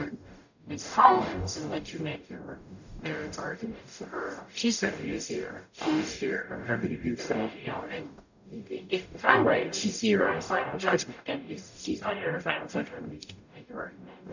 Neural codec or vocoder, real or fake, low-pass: codec, 44.1 kHz, 0.9 kbps, DAC; fake; 7.2 kHz